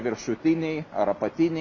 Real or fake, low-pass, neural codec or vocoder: real; 7.2 kHz; none